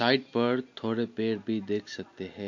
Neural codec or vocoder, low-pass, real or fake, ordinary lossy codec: none; 7.2 kHz; real; MP3, 48 kbps